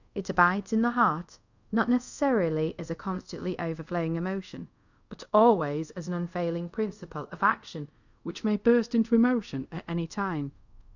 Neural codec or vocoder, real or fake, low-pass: codec, 24 kHz, 0.5 kbps, DualCodec; fake; 7.2 kHz